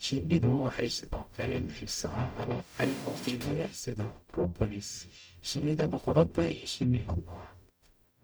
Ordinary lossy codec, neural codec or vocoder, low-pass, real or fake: none; codec, 44.1 kHz, 0.9 kbps, DAC; none; fake